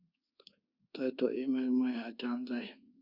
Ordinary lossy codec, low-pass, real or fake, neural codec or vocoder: Opus, 64 kbps; 5.4 kHz; fake; codec, 24 kHz, 1.2 kbps, DualCodec